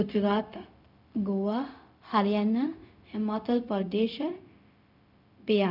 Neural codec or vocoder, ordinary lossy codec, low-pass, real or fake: codec, 16 kHz, 0.4 kbps, LongCat-Audio-Codec; none; 5.4 kHz; fake